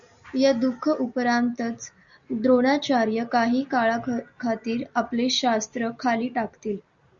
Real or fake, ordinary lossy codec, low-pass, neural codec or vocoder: real; Opus, 64 kbps; 7.2 kHz; none